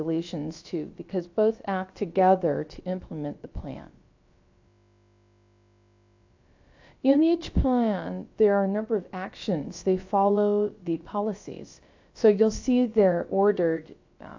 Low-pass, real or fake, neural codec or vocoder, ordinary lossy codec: 7.2 kHz; fake; codec, 16 kHz, about 1 kbps, DyCAST, with the encoder's durations; AAC, 48 kbps